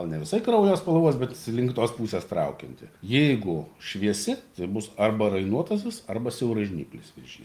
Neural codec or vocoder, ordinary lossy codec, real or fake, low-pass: none; Opus, 32 kbps; real; 14.4 kHz